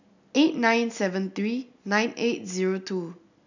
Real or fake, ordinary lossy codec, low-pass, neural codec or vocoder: real; none; 7.2 kHz; none